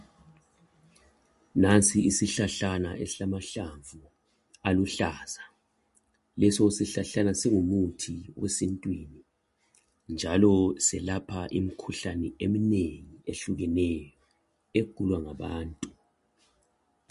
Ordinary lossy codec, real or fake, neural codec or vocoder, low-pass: MP3, 48 kbps; real; none; 14.4 kHz